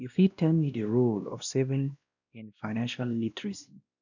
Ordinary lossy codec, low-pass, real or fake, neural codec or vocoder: none; 7.2 kHz; fake; codec, 16 kHz, 1 kbps, X-Codec, WavLM features, trained on Multilingual LibriSpeech